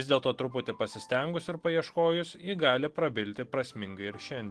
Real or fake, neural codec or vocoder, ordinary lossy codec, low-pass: real; none; Opus, 16 kbps; 10.8 kHz